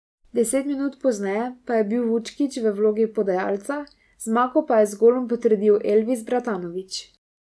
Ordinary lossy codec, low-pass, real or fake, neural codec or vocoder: none; none; real; none